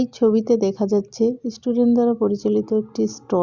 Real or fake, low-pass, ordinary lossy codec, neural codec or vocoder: real; 7.2 kHz; Opus, 64 kbps; none